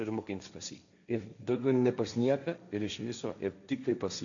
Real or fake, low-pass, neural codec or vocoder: fake; 7.2 kHz; codec, 16 kHz, 1.1 kbps, Voila-Tokenizer